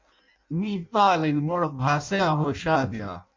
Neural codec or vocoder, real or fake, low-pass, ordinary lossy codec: codec, 16 kHz in and 24 kHz out, 0.6 kbps, FireRedTTS-2 codec; fake; 7.2 kHz; MP3, 48 kbps